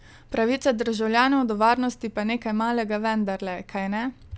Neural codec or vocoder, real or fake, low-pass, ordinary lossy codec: none; real; none; none